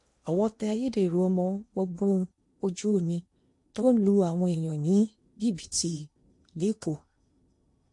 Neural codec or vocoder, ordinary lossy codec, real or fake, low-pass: codec, 16 kHz in and 24 kHz out, 0.8 kbps, FocalCodec, streaming, 65536 codes; MP3, 48 kbps; fake; 10.8 kHz